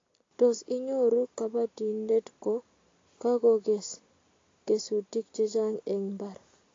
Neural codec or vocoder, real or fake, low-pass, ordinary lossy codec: none; real; 7.2 kHz; AAC, 32 kbps